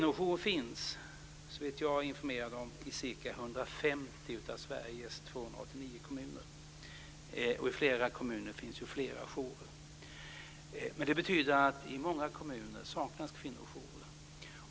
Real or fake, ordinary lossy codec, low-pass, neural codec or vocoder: real; none; none; none